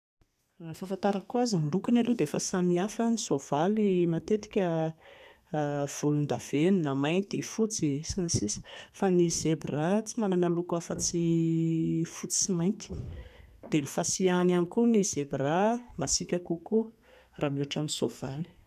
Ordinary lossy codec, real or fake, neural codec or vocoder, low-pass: none; fake; codec, 32 kHz, 1.9 kbps, SNAC; 14.4 kHz